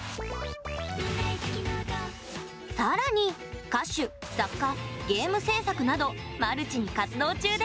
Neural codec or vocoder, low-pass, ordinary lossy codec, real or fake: none; none; none; real